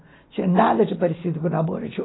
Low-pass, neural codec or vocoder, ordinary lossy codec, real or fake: 7.2 kHz; none; AAC, 16 kbps; real